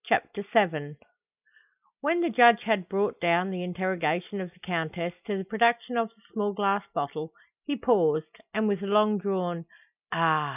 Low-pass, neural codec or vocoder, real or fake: 3.6 kHz; none; real